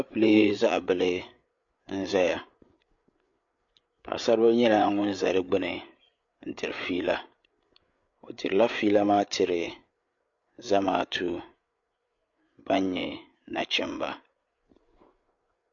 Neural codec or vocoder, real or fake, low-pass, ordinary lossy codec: codec, 16 kHz, 8 kbps, FreqCodec, larger model; fake; 7.2 kHz; MP3, 48 kbps